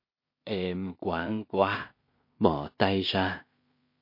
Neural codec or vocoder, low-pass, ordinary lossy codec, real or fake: codec, 16 kHz in and 24 kHz out, 0.4 kbps, LongCat-Audio-Codec, two codebook decoder; 5.4 kHz; MP3, 32 kbps; fake